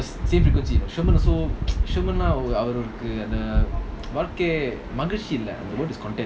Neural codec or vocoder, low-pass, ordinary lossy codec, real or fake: none; none; none; real